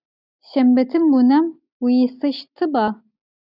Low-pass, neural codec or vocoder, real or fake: 5.4 kHz; none; real